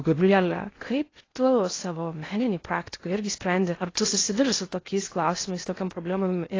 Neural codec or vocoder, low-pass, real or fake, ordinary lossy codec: codec, 16 kHz in and 24 kHz out, 0.6 kbps, FocalCodec, streaming, 2048 codes; 7.2 kHz; fake; AAC, 32 kbps